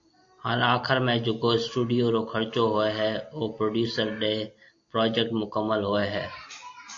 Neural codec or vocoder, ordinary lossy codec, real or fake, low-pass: none; AAC, 48 kbps; real; 7.2 kHz